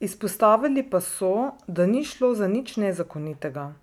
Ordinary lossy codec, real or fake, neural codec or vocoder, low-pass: none; real; none; 19.8 kHz